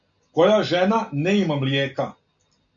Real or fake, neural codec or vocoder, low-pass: real; none; 7.2 kHz